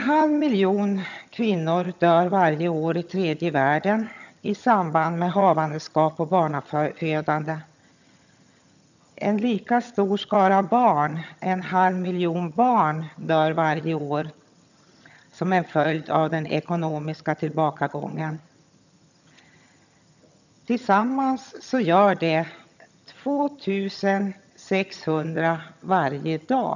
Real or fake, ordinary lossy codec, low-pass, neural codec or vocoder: fake; none; 7.2 kHz; vocoder, 22.05 kHz, 80 mel bands, HiFi-GAN